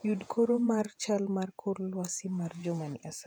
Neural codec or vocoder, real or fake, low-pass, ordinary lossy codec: vocoder, 48 kHz, 128 mel bands, Vocos; fake; 19.8 kHz; none